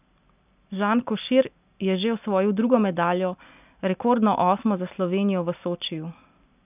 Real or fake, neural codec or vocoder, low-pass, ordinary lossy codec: real; none; 3.6 kHz; none